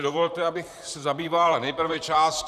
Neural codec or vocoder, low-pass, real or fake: vocoder, 44.1 kHz, 128 mel bands, Pupu-Vocoder; 14.4 kHz; fake